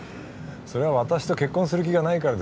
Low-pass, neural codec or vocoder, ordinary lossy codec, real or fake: none; none; none; real